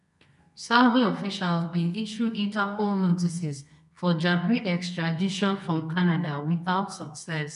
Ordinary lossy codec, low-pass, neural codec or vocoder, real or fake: none; 10.8 kHz; codec, 24 kHz, 0.9 kbps, WavTokenizer, medium music audio release; fake